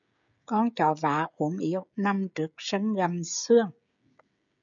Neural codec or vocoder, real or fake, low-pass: codec, 16 kHz, 16 kbps, FreqCodec, smaller model; fake; 7.2 kHz